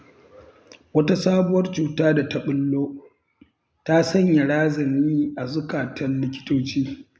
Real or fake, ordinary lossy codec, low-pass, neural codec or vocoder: real; none; none; none